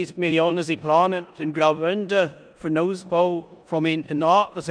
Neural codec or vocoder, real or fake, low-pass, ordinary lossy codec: codec, 16 kHz in and 24 kHz out, 0.9 kbps, LongCat-Audio-Codec, four codebook decoder; fake; 9.9 kHz; none